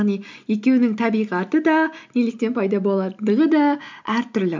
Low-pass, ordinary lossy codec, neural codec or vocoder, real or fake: 7.2 kHz; MP3, 64 kbps; none; real